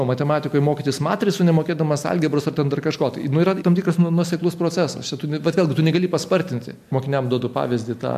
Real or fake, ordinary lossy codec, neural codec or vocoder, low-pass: real; MP3, 64 kbps; none; 14.4 kHz